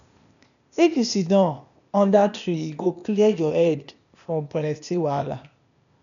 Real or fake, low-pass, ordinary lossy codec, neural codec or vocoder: fake; 7.2 kHz; none; codec, 16 kHz, 0.8 kbps, ZipCodec